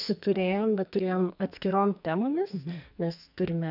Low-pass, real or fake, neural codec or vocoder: 5.4 kHz; fake; codec, 44.1 kHz, 2.6 kbps, SNAC